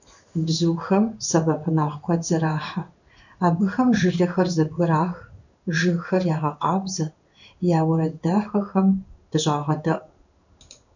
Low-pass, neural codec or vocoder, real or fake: 7.2 kHz; codec, 16 kHz in and 24 kHz out, 1 kbps, XY-Tokenizer; fake